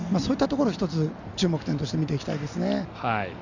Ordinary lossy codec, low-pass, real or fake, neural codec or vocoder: none; 7.2 kHz; real; none